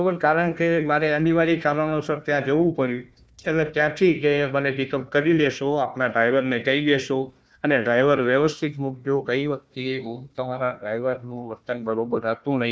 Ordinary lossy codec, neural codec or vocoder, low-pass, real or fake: none; codec, 16 kHz, 1 kbps, FunCodec, trained on Chinese and English, 50 frames a second; none; fake